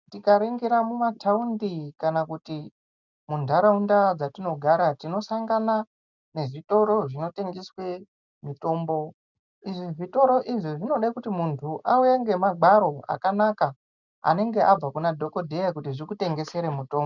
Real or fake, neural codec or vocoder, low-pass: real; none; 7.2 kHz